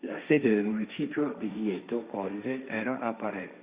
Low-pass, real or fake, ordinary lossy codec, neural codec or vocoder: 3.6 kHz; fake; Opus, 64 kbps; codec, 16 kHz, 1.1 kbps, Voila-Tokenizer